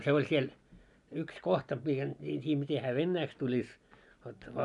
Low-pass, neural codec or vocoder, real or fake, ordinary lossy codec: 10.8 kHz; none; real; none